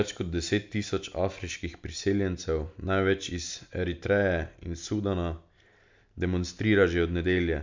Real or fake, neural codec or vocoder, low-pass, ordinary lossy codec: real; none; 7.2 kHz; MP3, 64 kbps